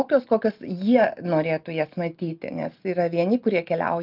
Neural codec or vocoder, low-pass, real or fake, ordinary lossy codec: none; 5.4 kHz; real; Opus, 24 kbps